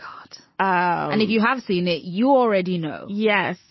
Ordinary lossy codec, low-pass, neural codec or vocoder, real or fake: MP3, 24 kbps; 7.2 kHz; none; real